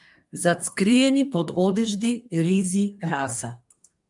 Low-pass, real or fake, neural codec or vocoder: 10.8 kHz; fake; codec, 24 kHz, 1 kbps, SNAC